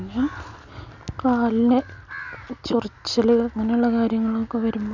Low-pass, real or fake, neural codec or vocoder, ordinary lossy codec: 7.2 kHz; real; none; none